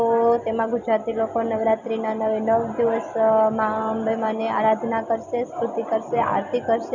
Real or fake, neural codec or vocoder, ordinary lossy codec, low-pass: real; none; none; 7.2 kHz